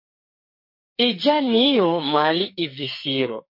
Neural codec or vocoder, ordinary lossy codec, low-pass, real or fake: codec, 44.1 kHz, 2.6 kbps, SNAC; MP3, 32 kbps; 5.4 kHz; fake